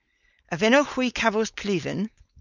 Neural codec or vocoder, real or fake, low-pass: codec, 16 kHz, 4.8 kbps, FACodec; fake; 7.2 kHz